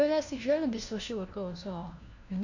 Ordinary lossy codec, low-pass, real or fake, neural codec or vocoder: none; 7.2 kHz; fake; codec, 16 kHz, 1 kbps, FunCodec, trained on LibriTTS, 50 frames a second